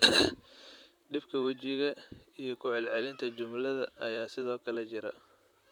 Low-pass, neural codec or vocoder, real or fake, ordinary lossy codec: none; vocoder, 44.1 kHz, 128 mel bands, Pupu-Vocoder; fake; none